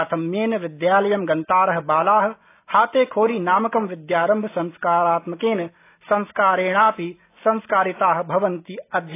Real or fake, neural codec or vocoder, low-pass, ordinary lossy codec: real; none; 3.6 kHz; AAC, 24 kbps